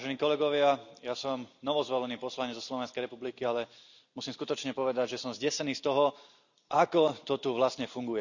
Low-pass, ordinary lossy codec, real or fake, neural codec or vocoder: 7.2 kHz; none; real; none